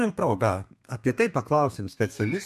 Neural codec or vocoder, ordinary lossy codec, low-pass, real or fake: codec, 32 kHz, 1.9 kbps, SNAC; MP3, 96 kbps; 14.4 kHz; fake